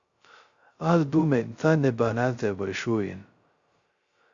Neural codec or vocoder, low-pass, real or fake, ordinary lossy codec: codec, 16 kHz, 0.2 kbps, FocalCodec; 7.2 kHz; fake; Opus, 64 kbps